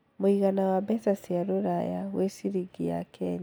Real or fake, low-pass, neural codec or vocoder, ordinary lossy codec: real; none; none; none